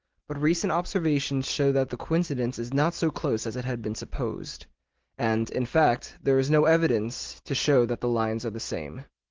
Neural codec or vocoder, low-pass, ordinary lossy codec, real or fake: none; 7.2 kHz; Opus, 16 kbps; real